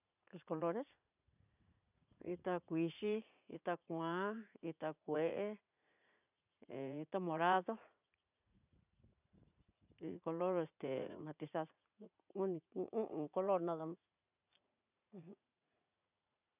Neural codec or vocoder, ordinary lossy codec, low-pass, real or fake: vocoder, 44.1 kHz, 80 mel bands, Vocos; none; 3.6 kHz; fake